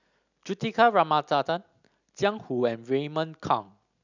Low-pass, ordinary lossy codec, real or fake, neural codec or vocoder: 7.2 kHz; none; real; none